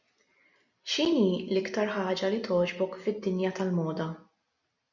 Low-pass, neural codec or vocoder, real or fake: 7.2 kHz; none; real